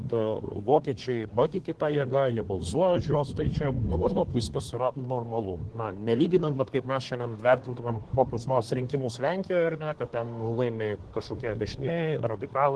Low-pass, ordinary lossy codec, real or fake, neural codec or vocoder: 10.8 kHz; Opus, 16 kbps; fake; codec, 24 kHz, 1 kbps, SNAC